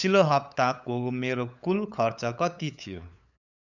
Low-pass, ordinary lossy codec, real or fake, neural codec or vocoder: 7.2 kHz; none; fake; codec, 16 kHz, 8 kbps, FunCodec, trained on LibriTTS, 25 frames a second